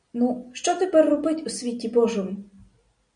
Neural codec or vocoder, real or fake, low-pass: none; real; 9.9 kHz